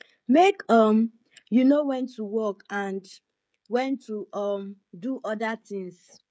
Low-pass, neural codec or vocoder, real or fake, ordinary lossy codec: none; codec, 16 kHz, 16 kbps, FreqCodec, smaller model; fake; none